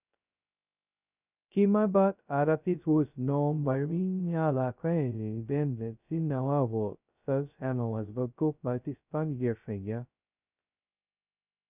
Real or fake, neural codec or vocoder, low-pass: fake; codec, 16 kHz, 0.2 kbps, FocalCodec; 3.6 kHz